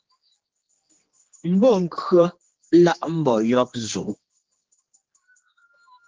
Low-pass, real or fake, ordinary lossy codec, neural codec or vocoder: 7.2 kHz; fake; Opus, 16 kbps; codec, 16 kHz, 2 kbps, X-Codec, HuBERT features, trained on general audio